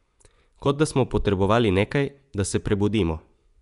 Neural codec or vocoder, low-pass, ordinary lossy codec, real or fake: vocoder, 24 kHz, 100 mel bands, Vocos; 10.8 kHz; none; fake